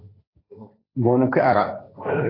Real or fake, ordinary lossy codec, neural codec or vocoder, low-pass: fake; AAC, 24 kbps; codec, 16 kHz, 1.1 kbps, Voila-Tokenizer; 5.4 kHz